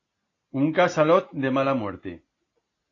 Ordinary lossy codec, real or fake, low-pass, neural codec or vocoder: AAC, 32 kbps; real; 7.2 kHz; none